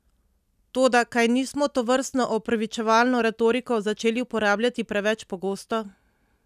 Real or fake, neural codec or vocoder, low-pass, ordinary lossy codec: real; none; 14.4 kHz; none